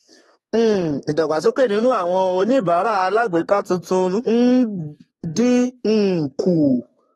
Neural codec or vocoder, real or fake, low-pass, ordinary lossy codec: codec, 32 kHz, 1.9 kbps, SNAC; fake; 14.4 kHz; AAC, 32 kbps